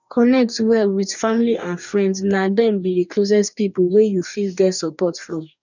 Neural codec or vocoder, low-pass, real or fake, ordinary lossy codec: codec, 44.1 kHz, 2.6 kbps, DAC; 7.2 kHz; fake; none